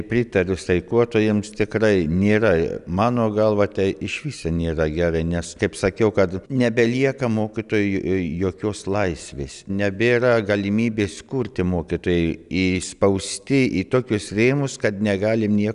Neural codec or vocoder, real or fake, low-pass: none; real; 10.8 kHz